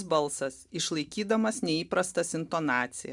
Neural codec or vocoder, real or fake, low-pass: none; real; 10.8 kHz